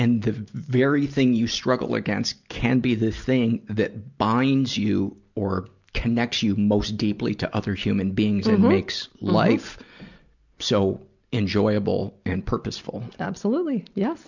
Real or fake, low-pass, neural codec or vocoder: real; 7.2 kHz; none